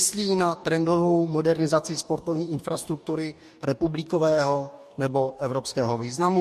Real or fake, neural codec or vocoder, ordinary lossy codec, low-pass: fake; codec, 44.1 kHz, 2.6 kbps, DAC; MP3, 64 kbps; 14.4 kHz